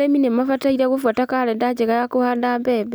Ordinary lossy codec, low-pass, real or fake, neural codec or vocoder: none; none; real; none